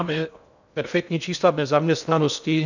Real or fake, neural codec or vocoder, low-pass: fake; codec, 16 kHz in and 24 kHz out, 0.6 kbps, FocalCodec, streaming, 2048 codes; 7.2 kHz